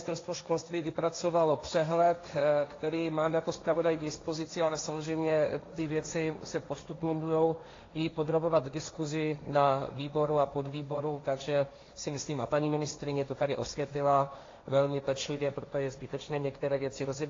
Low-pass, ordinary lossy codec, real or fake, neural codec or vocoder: 7.2 kHz; AAC, 32 kbps; fake; codec, 16 kHz, 1.1 kbps, Voila-Tokenizer